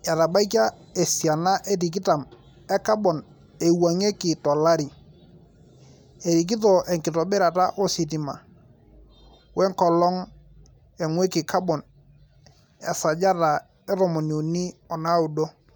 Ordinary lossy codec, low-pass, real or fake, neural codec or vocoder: none; none; real; none